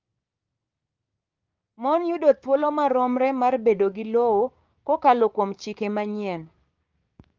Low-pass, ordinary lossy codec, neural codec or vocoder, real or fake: 7.2 kHz; Opus, 32 kbps; codec, 16 kHz, 6 kbps, DAC; fake